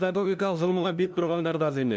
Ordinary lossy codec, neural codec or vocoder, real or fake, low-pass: none; codec, 16 kHz, 0.5 kbps, FunCodec, trained on LibriTTS, 25 frames a second; fake; none